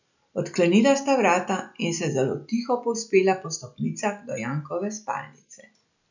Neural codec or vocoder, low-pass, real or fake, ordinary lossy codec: none; 7.2 kHz; real; none